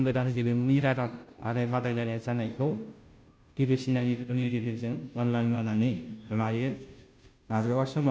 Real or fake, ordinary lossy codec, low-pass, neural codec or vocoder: fake; none; none; codec, 16 kHz, 0.5 kbps, FunCodec, trained on Chinese and English, 25 frames a second